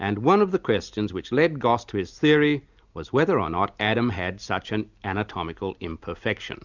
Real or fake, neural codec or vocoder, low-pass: real; none; 7.2 kHz